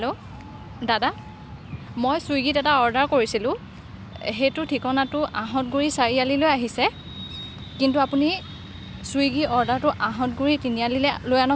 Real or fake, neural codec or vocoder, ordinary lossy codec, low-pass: real; none; none; none